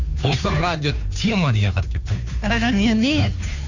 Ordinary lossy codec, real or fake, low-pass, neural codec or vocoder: none; fake; 7.2 kHz; codec, 16 kHz, 2 kbps, FunCodec, trained on Chinese and English, 25 frames a second